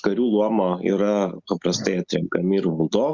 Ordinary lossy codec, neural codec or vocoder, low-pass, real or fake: Opus, 64 kbps; none; 7.2 kHz; real